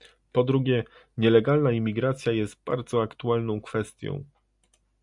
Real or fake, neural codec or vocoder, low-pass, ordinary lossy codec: real; none; 10.8 kHz; MP3, 96 kbps